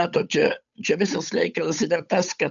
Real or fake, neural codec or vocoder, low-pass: fake; codec, 16 kHz, 8 kbps, FunCodec, trained on Chinese and English, 25 frames a second; 7.2 kHz